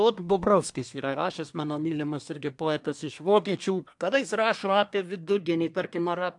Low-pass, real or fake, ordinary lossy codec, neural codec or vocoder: 10.8 kHz; fake; AAC, 64 kbps; codec, 24 kHz, 1 kbps, SNAC